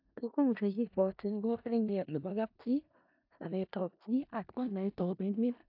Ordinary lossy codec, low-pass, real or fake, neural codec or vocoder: AAC, 48 kbps; 5.4 kHz; fake; codec, 16 kHz in and 24 kHz out, 0.4 kbps, LongCat-Audio-Codec, four codebook decoder